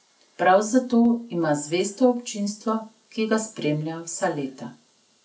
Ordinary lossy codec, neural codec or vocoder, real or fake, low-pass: none; none; real; none